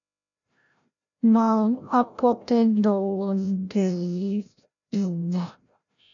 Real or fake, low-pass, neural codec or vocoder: fake; 7.2 kHz; codec, 16 kHz, 0.5 kbps, FreqCodec, larger model